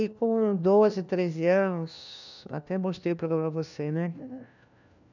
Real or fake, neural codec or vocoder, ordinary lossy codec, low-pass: fake; codec, 16 kHz, 1 kbps, FunCodec, trained on LibriTTS, 50 frames a second; none; 7.2 kHz